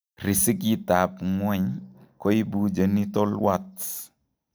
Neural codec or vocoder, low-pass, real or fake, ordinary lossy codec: none; none; real; none